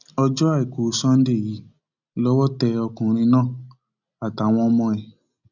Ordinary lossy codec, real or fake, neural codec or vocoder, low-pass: none; real; none; 7.2 kHz